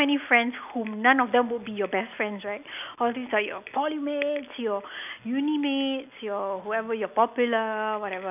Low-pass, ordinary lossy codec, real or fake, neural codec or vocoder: 3.6 kHz; none; real; none